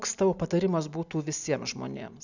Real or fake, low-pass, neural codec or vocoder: real; 7.2 kHz; none